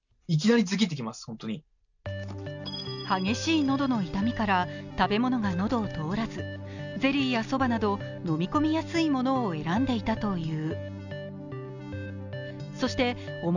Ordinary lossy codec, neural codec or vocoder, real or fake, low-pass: none; none; real; 7.2 kHz